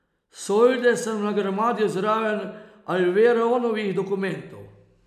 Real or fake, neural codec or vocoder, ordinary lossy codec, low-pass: real; none; none; 14.4 kHz